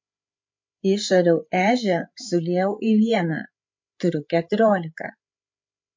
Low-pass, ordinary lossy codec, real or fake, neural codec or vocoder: 7.2 kHz; MP3, 48 kbps; fake; codec, 16 kHz, 8 kbps, FreqCodec, larger model